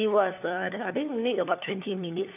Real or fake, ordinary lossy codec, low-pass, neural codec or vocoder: fake; none; 3.6 kHz; codec, 16 kHz, 4 kbps, FunCodec, trained on LibriTTS, 50 frames a second